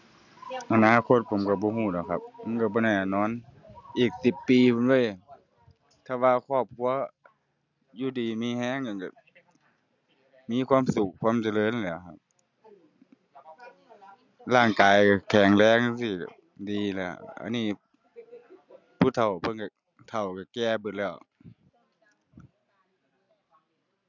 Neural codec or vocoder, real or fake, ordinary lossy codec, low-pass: none; real; none; 7.2 kHz